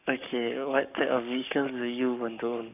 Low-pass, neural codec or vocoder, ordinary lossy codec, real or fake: 3.6 kHz; codec, 44.1 kHz, 7.8 kbps, Pupu-Codec; none; fake